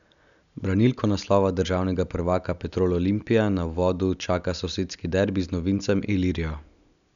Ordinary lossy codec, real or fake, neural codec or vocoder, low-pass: none; real; none; 7.2 kHz